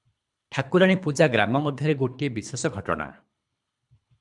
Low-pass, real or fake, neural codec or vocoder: 10.8 kHz; fake; codec, 24 kHz, 3 kbps, HILCodec